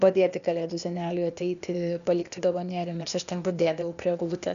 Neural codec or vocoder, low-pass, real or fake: codec, 16 kHz, 0.8 kbps, ZipCodec; 7.2 kHz; fake